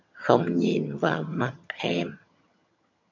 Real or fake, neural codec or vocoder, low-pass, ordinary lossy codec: fake; vocoder, 22.05 kHz, 80 mel bands, HiFi-GAN; 7.2 kHz; MP3, 64 kbps